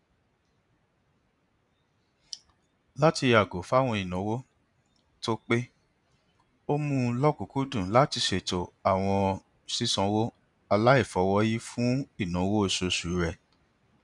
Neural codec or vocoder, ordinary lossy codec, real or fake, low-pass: none; none; real; 10.8 kHz